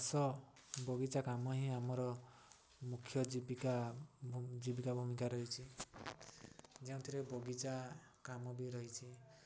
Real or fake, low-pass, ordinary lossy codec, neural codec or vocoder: real; none; none; none